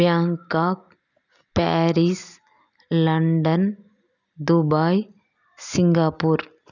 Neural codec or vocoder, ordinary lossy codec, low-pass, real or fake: none; none; 7.2 kHz; real